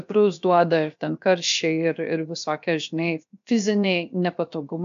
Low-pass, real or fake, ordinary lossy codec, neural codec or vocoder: 7.2 kHz; fake; MP3, 64 kbps; codec, 16 kHz, 0.7 kbps, FocalCodec